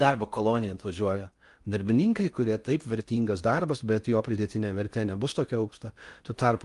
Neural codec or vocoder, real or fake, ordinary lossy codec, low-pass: codec, 16 kHz in and 24 kHz out, 0.6 kbps, FocalCodec, streaming, 4096 codes; fake; Opus, 32 kbps; 10.8 kHz